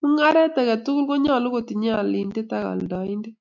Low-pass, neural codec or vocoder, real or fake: 7.2 kHz; none; real